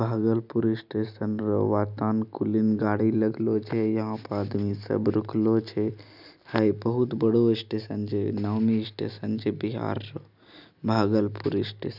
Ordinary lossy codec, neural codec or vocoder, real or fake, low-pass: none; none; real; 5.4 kHz